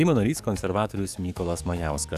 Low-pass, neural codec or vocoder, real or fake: 14.4 kHz; codec, 44.1 kHz, 7.8 kbps, DAC; fake